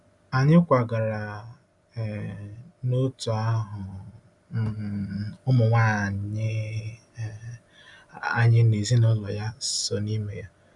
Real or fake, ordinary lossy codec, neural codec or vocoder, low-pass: real; none; none; 10.8 kHz